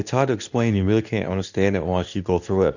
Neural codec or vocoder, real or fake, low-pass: codec, 24 kHz, 0.9 kbps, WavTokenizer, medium speech release version 2; fake; 7.2 kHz